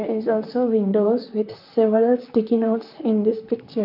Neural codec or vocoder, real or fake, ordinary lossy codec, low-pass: vocoder, 44.1 kHz, 128 mel bands, Pupu-Vocoder; fake; none; 5.4 kHz